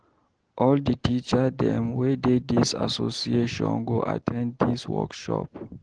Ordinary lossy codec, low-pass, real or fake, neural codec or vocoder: Opus, 16 kbps; 9.9 kHz; real; none